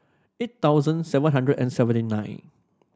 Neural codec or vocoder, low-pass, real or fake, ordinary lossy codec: none; none; real; none